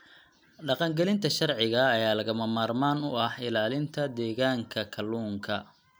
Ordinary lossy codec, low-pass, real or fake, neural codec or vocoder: none; none; real; none